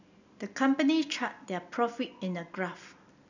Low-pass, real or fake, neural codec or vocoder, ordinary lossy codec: 7.2 kHz; real; none; none